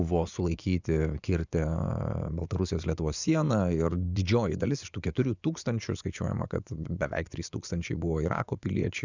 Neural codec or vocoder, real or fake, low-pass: vocoder, 24 kHz, 100 mel bands, Vocos; fake; 7.2 kHz